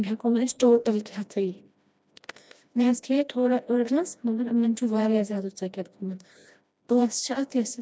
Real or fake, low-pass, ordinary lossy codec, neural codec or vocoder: fake; none; none; codec, 16 kHz, 1 kbps, FreqCodec, smaller model